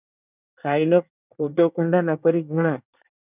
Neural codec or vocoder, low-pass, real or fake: codec, 24 kHz, 1 kbps, SNAC; 3.6 kHz; fake